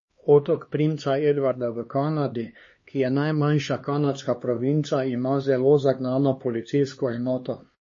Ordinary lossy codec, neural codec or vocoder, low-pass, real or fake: MP3, 32 kbps; codec, 16 kHz, 2 kbps, X-Codec, HuBERT features, trained on LibriSpeech; 7.2 kHz; fake